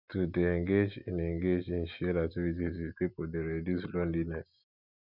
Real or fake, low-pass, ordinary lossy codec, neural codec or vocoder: real; 5.4 kHz; none; none